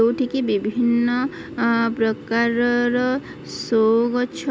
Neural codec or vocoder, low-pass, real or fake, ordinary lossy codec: none; none; real; none